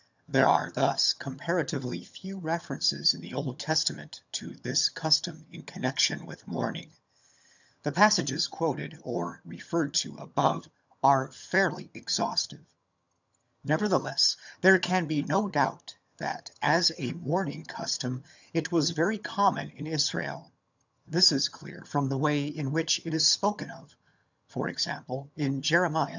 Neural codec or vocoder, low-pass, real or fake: vocoder, 22.05 kHz, 80 mel bands, HiFi-GAN; 7.2 kHz; fake